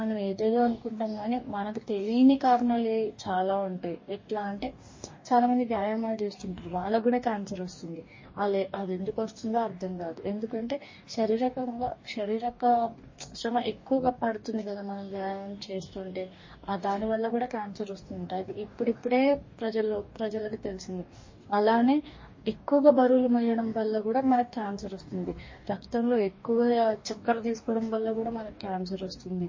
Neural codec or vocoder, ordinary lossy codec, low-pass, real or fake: codec, 44.1 kHz, 2.6 kbps, DAC; MP3, 32 kbps; 7.2 kHz; fake